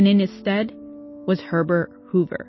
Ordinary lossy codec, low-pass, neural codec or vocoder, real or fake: MP3, 24 kbps; 7.2 kHz; none; real